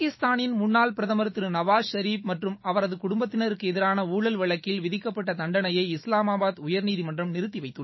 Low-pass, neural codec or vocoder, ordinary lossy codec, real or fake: 7.2 kHz; autoencoder, 48 kHz, 128 numbers a frame, DAC-VAE, trained on Japanese speech; MP3, 24 kbps; fake